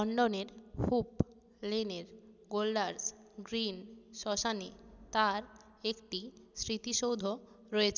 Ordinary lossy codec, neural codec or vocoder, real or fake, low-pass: none; none; real; 7.2 kHz